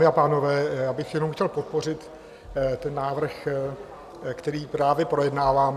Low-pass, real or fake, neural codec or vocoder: 14.4 kHz; real; none